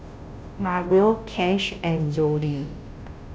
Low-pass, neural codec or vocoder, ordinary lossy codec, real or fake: none; codec, 16 kHz, 0.5 kbps, FunCodec, trained on Chinese and English, 25 frames a second; none; fake